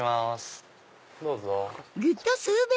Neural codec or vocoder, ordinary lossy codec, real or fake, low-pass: none; none; real; none